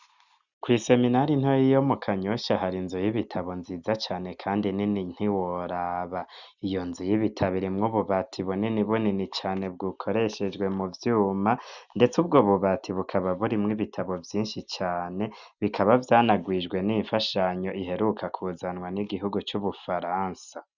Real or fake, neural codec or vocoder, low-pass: real; none; 7.2 kHz